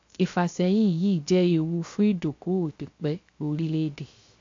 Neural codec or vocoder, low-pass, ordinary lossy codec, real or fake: codec, 16 kHz, 0.3 kbps, FocalCodec; 7.2 kHz; AAC, 48 kbps; fake